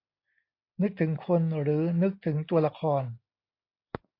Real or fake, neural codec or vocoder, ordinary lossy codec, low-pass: real; none; AAC, 32 kbps; 5.4 kHz